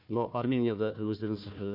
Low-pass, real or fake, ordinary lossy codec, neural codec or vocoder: 5.4 kHz; fake; none; codec, 16 kHz, 1 kbps, FunCodec, trained on Chinese and English, 50 frames a second